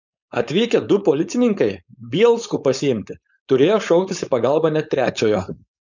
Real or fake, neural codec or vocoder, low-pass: fake; codec, 16 kHz, 4.8 kbps, FACodec; 7.2 kHz